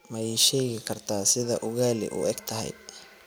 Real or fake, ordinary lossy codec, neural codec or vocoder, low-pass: fake; none; vocoder, 44.1 kHz, 128 mel bands every 512 samples, BigVGAN v2; none